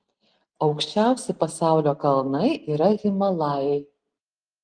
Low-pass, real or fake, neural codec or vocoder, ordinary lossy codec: 9.9 kHz; real; none; Opus, 24 kbps